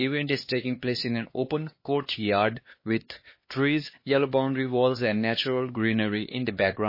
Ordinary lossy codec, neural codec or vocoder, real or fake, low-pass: MP3, 24 kbps; codec, 16 kHz, 2 kbps, X-Codec, HuBERT features, trained on LibriSpeech; fake; 5.4 kHz